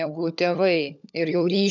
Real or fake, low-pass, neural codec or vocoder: fake; 7.2 kHz; codec, 16 kHz, 16 kbps, FunCodec, trained on LibriTTS, 50 frames a second